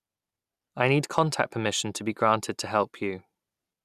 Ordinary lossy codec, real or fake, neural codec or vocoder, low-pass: none; real; none; 14.4 kHz